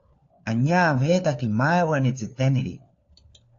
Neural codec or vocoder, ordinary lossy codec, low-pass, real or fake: codec, 16 kHz, 4 kbps, FunCodec, trained on LibriTTS, 50 frames a second; AAC, 48 kbps; 7.2 kHz; fake